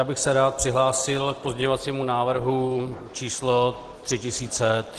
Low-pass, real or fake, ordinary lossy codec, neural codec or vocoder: 10.8 kHz; real; Opus, 16 kbps; none